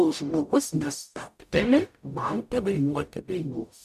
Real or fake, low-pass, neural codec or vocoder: fake; 14.4 kHz; codec, 44.1 kHz, 0.9 kbps, DAC